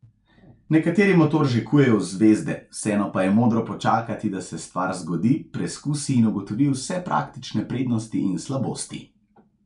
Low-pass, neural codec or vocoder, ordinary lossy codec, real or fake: 10.8 kHz; none; none; real